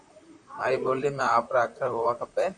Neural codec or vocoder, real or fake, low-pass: vocoder, 44.1 kHz, 128 mel bands, Pupu-Vocoder; fake; 10.8 kHz